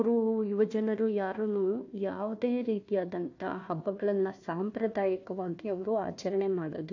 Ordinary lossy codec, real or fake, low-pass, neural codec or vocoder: AAC, 48 kbps; fake; 7.2 kHz; codec, 16 kHz, 1 kbps, FunCodec, trained on Chinese and English, 50 frames a second